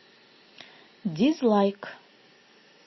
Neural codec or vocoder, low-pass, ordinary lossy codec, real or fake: none; 7.2 kHz; MP3, 24 kbps; real